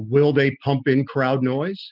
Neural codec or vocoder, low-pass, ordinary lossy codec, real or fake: none; 5.4 kHz; Opus, 32 kbps; real